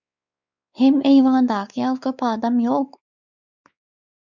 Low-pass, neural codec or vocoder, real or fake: 7.2 kHz; codec, 16 kHz, 4 kbps, X-Codec, WavLM features, trained on Multilingual LibriSpeech; fake